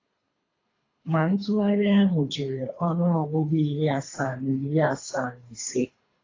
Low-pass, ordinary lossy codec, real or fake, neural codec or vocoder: 7.2 kHz; AAC, 32 kbps; fake; codec, 24 kHz, 3 kbps, HILCodec